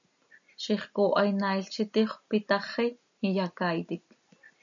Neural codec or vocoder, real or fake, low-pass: none; real; 7.2 kHz